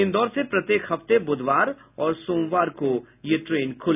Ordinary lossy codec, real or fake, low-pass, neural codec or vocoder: none; real; 3.6 kHz; none